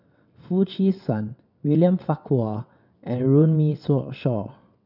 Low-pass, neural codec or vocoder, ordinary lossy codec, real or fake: 5.4 kHz; vocoder, 22.05 kHz, 80 mel bands, WaveNeXt; none; fake